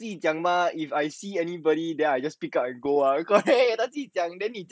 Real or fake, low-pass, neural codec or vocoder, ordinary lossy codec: real; none; none; none